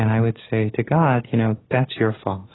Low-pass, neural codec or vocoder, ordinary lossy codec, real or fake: 7.2 kHz; none; AAC, 16 kbps; real